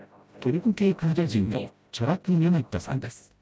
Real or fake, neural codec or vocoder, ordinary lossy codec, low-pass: fake; codec, 16 kHz, 0.5 kbps, FreqCodec, smaller model; none; none